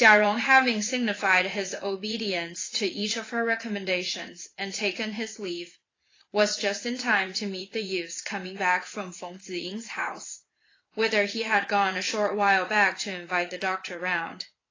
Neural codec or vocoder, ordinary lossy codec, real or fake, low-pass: vocoder, 44.1 kHz, 80 mel bands, Vocos; AAC, 32 kbps; fake; 7.2 kHz